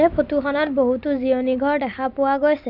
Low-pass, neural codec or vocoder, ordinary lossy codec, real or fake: 5.4 kHz; none; none; real